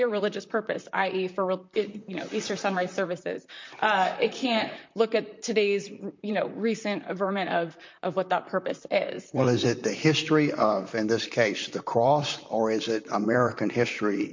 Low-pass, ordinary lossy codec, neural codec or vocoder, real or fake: 7.2 kHz; MP3, 48 kbps; vocoder, 44.1 kHz, 128 mel bands, Pupu-Vocoder; fake